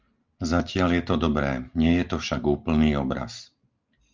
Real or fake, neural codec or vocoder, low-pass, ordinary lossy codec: real; none; 7.2 kHz; Opus, 24 kbps